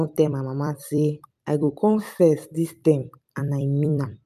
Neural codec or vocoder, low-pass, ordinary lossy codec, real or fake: vocoder, 44.1 kHz, 128 mel bands every 256 samples, BigVGAN v2; 14.4 kHz; none; fake